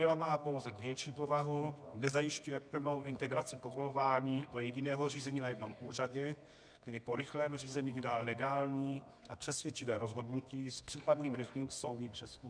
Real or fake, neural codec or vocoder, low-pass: fake; codec, 24 kHz, 0.9 kbps, WavTokenizer, medium music audio release; 9.9 kHz